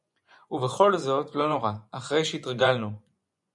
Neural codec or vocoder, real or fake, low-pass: vocoder, 24 kHz, 100 mel bands, Vocos; fake; 10.8 kHz